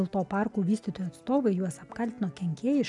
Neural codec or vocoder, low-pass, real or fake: none; 10.8 kHz; real